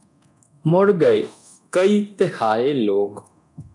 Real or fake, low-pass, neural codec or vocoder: fake; 10.8 kHz; codec, 24 kHz, 0.9 kbps, DualCodec